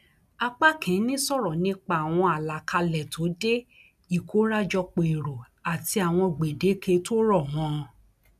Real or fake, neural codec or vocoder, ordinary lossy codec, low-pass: real; none; none; 14.4 kHz